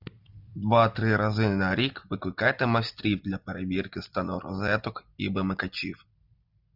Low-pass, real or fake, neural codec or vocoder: 5.4 kHz; real; none